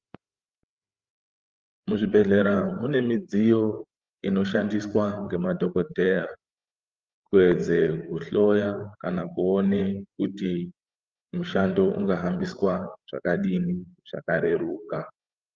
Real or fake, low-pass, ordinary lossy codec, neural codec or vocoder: fake; 7.2 kHz; Opus, 24 kbps; codec, 16 kHz, 8 kbps, FreqCodec, larger model